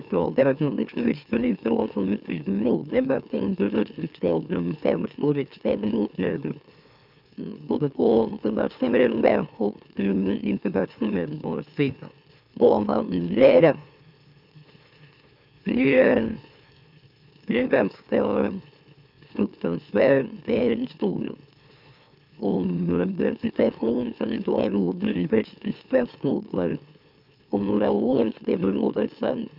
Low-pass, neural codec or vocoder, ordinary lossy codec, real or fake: 5.4 kHz; autoencoder, 44.1 kHz, a latent of 192 numbers a frame, MeloTTS; none; fake